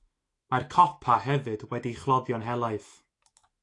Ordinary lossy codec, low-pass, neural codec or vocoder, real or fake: AAC, 48 kbps; 10.8 kHz; autoencoder, 48 kHz, 128 numbers a frame, DAC-VAE, trained on Japanese speech; fake